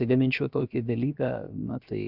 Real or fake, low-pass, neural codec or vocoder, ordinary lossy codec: fake; 5.4 kHz; codec, 16 kHz, 0.7 kbps, FocalCodec; Opus, 64 kbps